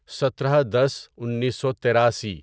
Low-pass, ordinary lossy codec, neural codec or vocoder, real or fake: none; none; none; real